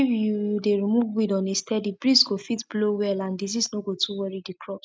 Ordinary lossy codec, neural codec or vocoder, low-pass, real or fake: none; none; none; real